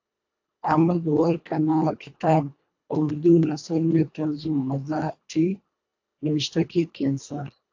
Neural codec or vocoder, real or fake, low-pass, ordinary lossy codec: codec, 24 kHz, 1.5 kbps, HILCodec; fake; 7.2 kHz; AAC, 48 kbps